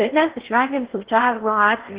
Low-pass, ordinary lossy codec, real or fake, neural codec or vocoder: 3.6 kHz; Opus, 16 kbps; fake; codec, 16 kHz in and 24 kHz out, 0.6 kbps, FocalCodec, streaming, 4096 codes